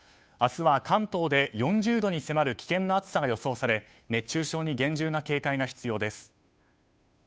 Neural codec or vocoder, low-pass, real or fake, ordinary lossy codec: codec, 16 kHz, 2 kbps, FunCodec, trained on Chinese and English, 25 frames a second; none; fake; none